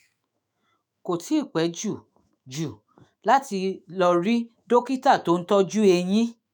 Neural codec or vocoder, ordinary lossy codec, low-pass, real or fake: autoencoder, 48 kHz, 128 numbers a frame, DAC-VAE, trained on Japanese speech; none; none; fake